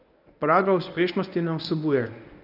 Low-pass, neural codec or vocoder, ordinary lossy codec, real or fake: 5.4 kHz; codec, 24 kHz, 0.9 kbps, WavTokenizer, medium speech release version 1; none; fake